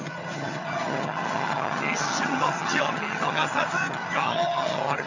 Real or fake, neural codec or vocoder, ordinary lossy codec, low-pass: fake; vocoder, 22.05 kHz, 80 mel bands, HiFi-GAN; none; 7.2 kHz